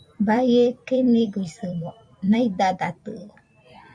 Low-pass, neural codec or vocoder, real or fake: 9.9 kHz; vocoder, 44.1 kHz, 128 mel bands every 256 samples, BigVGAN v2; fake